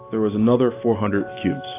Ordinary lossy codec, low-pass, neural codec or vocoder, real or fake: AAC, 24 kbps; 3.6 kHz; none; real